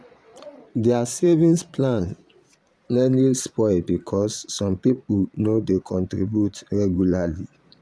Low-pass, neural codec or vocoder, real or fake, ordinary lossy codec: none; vocoder, 22.05 kHz, 80 mel bands, Vocos; fake; none